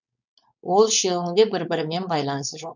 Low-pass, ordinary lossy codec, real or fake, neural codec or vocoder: 7.2 kHz; none; fake; codec, 16 kHz, 4.8 kbps, FACodec